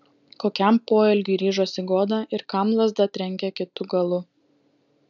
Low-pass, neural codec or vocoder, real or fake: 7.2 kHz; none; real